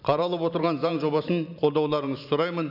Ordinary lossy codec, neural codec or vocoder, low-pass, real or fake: none; vocoder, 44.1 kHz, 128 mel bands every 512 samples, BigVGAN v2; 5.4 kHz; fake